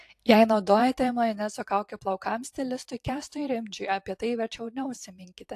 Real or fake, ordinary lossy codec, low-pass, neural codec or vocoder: fake; AAC, 64 kbps; 14.4 kHz; vocoder, 44.1 kHz, 128 mel bands every 512 samples, BigVGAN v2